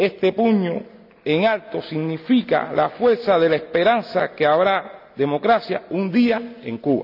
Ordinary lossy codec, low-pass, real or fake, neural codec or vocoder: none; 5.4 kHz; real; none